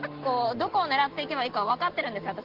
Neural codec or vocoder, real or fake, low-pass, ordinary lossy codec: none; real; 5.4 kHz; Opus, 16 kbps